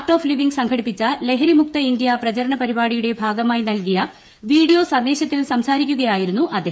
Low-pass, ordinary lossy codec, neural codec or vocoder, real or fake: none; none; codec, 16 kHz, 8 kbps, FreqCodec, smaller model; fake